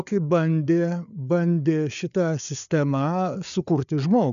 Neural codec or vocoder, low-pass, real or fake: codec, 16 kHz, 4 kbps, FreqCodec, larger model; 7.2 kHz; fake